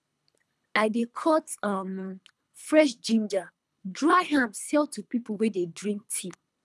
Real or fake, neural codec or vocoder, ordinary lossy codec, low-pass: fake; codec, 24 kHz, 3 kbps, HILCodec; none; none